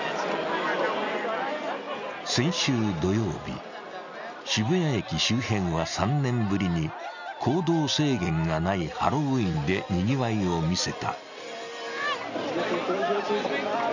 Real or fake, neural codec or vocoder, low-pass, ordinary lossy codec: real; none; 7.2 kHz; none